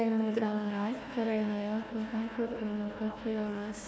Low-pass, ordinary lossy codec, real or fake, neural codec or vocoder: none; none; fake; codec, 16 kHz, 1 kbps, FunCodec, trained on Chinese and English, 50 frames a second